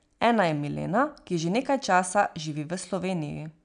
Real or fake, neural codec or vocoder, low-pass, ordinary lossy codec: real; none; 9.9 kHz; none